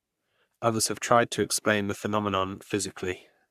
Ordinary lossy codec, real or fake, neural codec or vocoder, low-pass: none; fake; codec, 44.1 kHz, 3.4 kbps, Pupu-Codec; 14.4 kHz